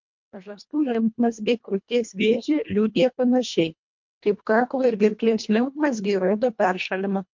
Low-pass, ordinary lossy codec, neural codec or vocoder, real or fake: 7.2 kHz; MP3, 48 kbps; codec, 24 kHz, 1.5 kbps, HILCodec; fake